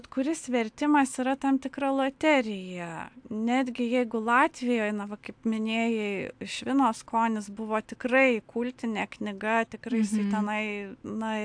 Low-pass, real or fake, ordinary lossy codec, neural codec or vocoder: 9.9 kHz; real; AAC, 64 kbps; none